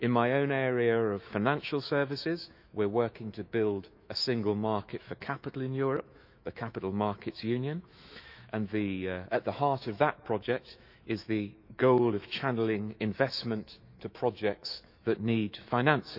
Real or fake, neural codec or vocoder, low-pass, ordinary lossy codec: fake; autoencoder, 48 kHz, 128 numbers a frame, DAC-VAE, trained on Japanese speech; 5.4 kHz; none